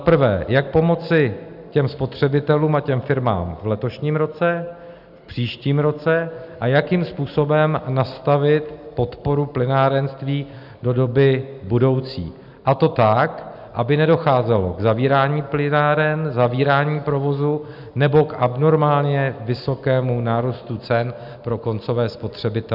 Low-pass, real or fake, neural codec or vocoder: 5.4 kHz; real; none